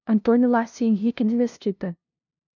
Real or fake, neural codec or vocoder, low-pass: fake; codec, 16 kHz, 0.5 kbps, FunCodec, trained on LibriTTS, 25 frames a second; 7.2 kHz